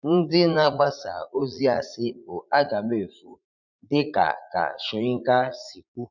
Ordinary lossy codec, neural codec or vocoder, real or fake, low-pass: none; vocoder, 44.1 kHz, 80 mel bands, Vocos; fake; 7.2 kHz